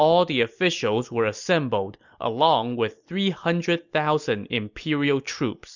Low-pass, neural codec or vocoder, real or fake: 7.2 kHz; none; real